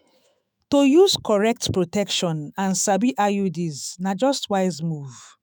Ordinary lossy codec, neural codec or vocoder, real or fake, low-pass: none; autoencoder, 48 kHz, 128 numbers a frame, DAC-VAE, trained on Japanese speech; fake; none